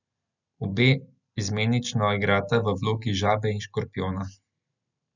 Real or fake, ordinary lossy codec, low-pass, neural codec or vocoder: real; none; 7.2 kHz; none